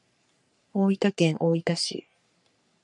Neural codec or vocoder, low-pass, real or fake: codec, 44.1 kHz, 3.4 kbps, Pupu-Codec; 10.8 kHz; fake